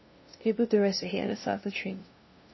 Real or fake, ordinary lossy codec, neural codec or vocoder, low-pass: fake; MP3, 24 kbps; codec, 16 kHz, 0.5 kbps, FunCodec, trained on LibriTTS, 25 frames a second; 7.2 kHz